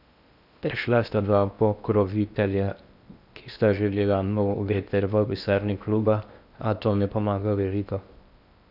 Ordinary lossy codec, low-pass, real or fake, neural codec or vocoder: none; 5.4 kHz; fake; codec, 16 kHz in and 24 kHz out, 0.6 kbps, FocalCodec, streaming, 2048 codes